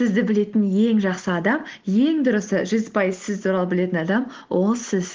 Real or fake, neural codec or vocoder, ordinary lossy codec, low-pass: real; none; Opus, 16 kbps; 7.2 kHz